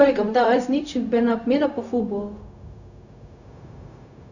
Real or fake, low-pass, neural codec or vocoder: fake; 7.2 kHz; codec, 16 kHz, 0.4 kbps, LongCat-Audio-Codec